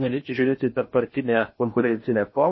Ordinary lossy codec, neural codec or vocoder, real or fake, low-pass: MP3, 24 kbps; codec, 16 kHz in and 24 kHz out, 0.6 kbps, FocalCodec, streaming, 2048 codes; fake; 7.2 kHz